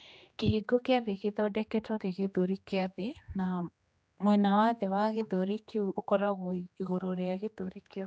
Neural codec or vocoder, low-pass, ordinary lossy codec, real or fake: codec, 16 kHz, 2 kbps, X-Codec, HuBERT features, trained on general audio; none; none; fake